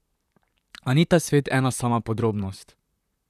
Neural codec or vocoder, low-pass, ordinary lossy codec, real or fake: vocoder, 44.1 kHz, 128 mel bands, Pupu-Vocoder; 14.4 kHz; none; fake